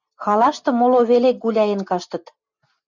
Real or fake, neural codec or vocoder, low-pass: real; none; 7.2 kHz